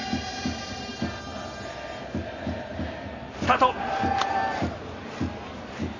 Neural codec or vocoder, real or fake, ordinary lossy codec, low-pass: none; real; AAC, 32 kbps; 7.2 kHz